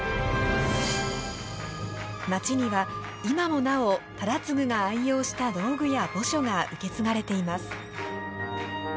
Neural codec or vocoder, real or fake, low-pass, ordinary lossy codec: none; real; none; none